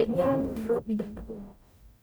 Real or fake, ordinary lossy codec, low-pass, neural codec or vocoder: fake; none; none; codec, 44.1 kHz, 0.9 kbps, DAC